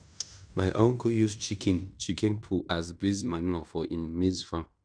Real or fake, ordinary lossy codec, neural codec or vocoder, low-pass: fake; none; codec, 16 kHz in and 24 kHz out, 0.9 kbps, LongCat-Audio-Codec, fine tuned four codebook decoder; 9.9 kHz